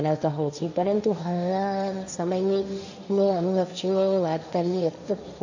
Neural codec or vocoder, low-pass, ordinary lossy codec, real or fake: codec, 16 kHz, 1.1 kbps, Voila-Tokenizer; 7.2 kHz; none; fake